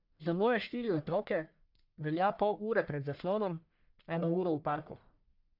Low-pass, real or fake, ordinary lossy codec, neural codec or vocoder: 5.4 kHz; fake; none; codec, 44.1 kHz, 1.7 kbps, Pupu-Codec